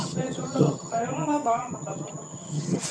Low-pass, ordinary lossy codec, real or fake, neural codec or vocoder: none; none; fake; vocoder, 22.05 kHz, 80 mel bands, HiFi-GAN